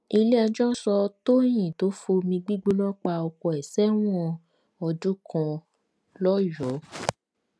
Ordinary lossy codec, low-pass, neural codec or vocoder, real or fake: none; none; none; real